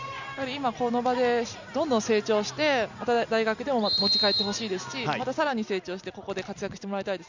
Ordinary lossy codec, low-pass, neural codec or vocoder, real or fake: Opus, 64 kbps; 7.2 kHz; none; real